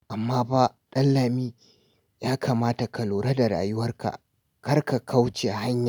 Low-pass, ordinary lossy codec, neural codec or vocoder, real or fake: none; none; none; real